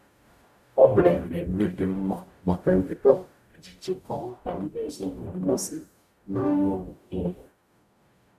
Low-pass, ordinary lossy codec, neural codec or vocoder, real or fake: 14.4 kHz; none; codec, 44.1 kHz, 0.9 kbps, DAC; fake